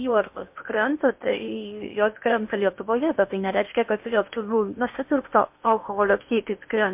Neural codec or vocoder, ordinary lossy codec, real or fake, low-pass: codec, 16 kHz in and 24 kHz out, 0.8 kbps, FocalCodec, streaming, 65536 codes; MP3, 32 kbps; fake; 3.6 kHz